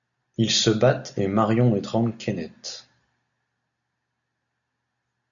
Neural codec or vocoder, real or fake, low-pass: none; real; 7.2 kHz